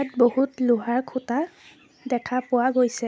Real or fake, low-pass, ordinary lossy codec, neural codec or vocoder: real; none; none; none